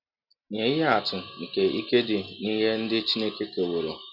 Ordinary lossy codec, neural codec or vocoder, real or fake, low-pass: none; none; real; 5.4 kHz